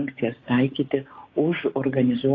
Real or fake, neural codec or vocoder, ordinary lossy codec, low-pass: fake; codec, 16 kHz, 6 kbps, DAC; AAC, 32 kbps; 7.2 kHz